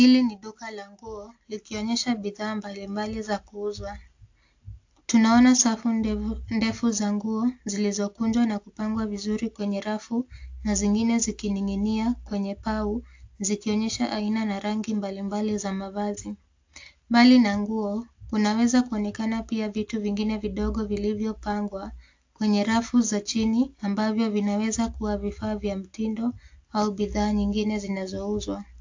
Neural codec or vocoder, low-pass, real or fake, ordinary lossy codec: none; 7.2 kHz; real; AAC, 48 kbps